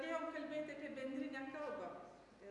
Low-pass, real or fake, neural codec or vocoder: 10.8 kHz; real; none